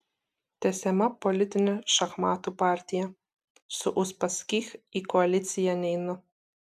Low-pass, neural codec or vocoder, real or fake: 14.4 kHz; none; real